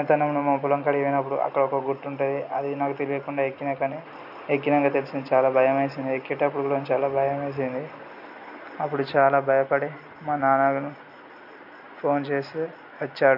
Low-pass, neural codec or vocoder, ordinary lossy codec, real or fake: 5.4 kHz; none; none; real